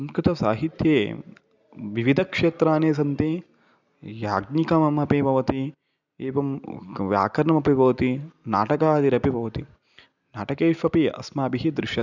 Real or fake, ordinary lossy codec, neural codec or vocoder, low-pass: real; none; none; 7.2 kHz